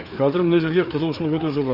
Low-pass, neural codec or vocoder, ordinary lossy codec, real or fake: 5.4 kHz; codec, 16 kHz, 4 kbps, FreqCodec, larger model; none; fake